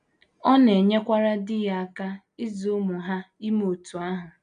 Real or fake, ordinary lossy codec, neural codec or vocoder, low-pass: real; none; none; 9.9 kHz